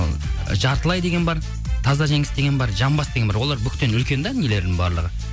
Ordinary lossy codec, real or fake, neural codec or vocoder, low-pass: none; real; none; none